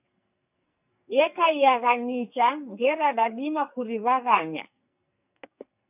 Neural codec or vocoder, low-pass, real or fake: codec, 44.1 kHz, 2.6 kbps, SNAC; 3.6 kHz; fake